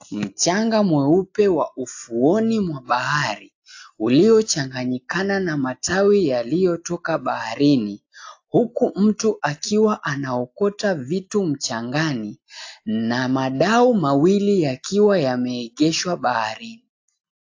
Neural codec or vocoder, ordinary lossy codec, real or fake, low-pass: none; AAC, 48 kbps; real; 7.2 kHz